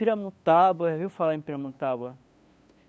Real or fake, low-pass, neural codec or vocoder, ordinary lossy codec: fake; none; codec, 16 kHz, 2 kbps, FunCodec, trained on LibriTTS, 25 frames a second; none